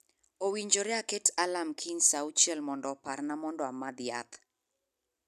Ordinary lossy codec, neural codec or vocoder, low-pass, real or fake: none; none; 14.4 kHz; real